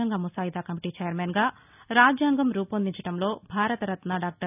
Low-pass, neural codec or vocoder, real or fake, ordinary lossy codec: 3.6 kHz; none; real; none